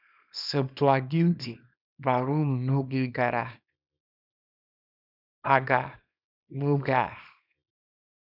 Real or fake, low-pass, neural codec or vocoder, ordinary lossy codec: fake; 5.4 kHz; codec, 24 kHz, 0.9 kbps, WavTokenizer, small release; none